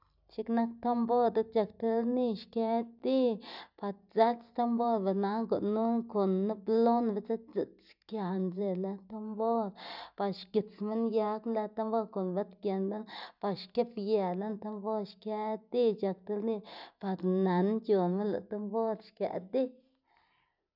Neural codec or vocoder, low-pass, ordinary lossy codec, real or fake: none; 5.4 kHz; none; real